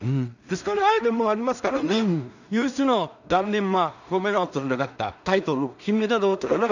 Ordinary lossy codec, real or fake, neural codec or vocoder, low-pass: none; fake; codec, 16 kHz in and 24 kHz out, 0.4 kbps, LongCat-Audio-Codec, two codebook decoder; 7.2 kHz